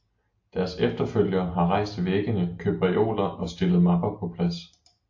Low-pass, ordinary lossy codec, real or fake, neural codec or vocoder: 7.2 kHz; AAC, 48 kbps; real; none